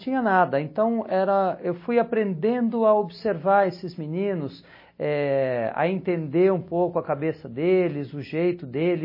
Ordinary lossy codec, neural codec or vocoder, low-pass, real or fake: MP3, 24 kbps; none; 5.4 kHz; real